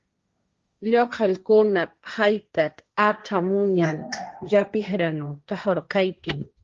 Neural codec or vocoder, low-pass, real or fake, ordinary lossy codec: codec, 16 kHz, 1.1 kbps, Voila-Tokenizer; 7.2 kHz; fake; Opus, 32 kbps